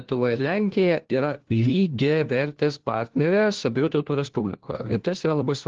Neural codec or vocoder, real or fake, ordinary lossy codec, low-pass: codec, 16 kHz, 1 kbps, FunCodec, trained on LibriTTS, 50 frames a second; fake; Opus, 16 kbps; 7.2 kHz